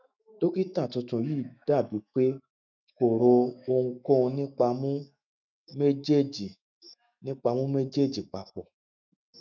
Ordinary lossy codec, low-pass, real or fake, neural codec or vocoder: none; 7.2 kHz; fake; autoencoder, 48 kHz, 128 numbers a frame, DAC-VAE, trained on Japanese speech